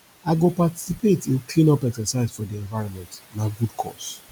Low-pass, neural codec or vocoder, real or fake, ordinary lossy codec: none; none; real; none